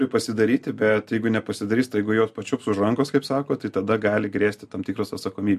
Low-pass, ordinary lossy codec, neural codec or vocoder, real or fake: 14.4 kHz; MP3, 64 kbps; none; real